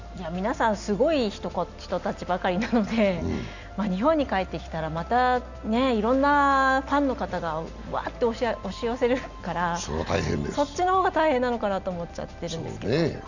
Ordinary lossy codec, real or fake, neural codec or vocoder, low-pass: none; real; none; 7.2 kHz